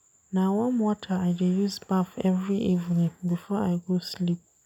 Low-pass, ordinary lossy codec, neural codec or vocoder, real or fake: 19.8 kHz; none; none; real